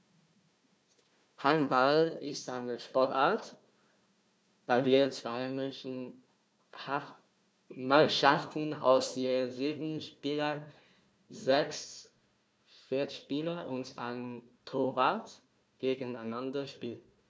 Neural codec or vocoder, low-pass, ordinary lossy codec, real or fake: codec, 16 kHz, 1 kbps, FunCodec, trained on Chinese and English, 50 frames a second; none; none; fake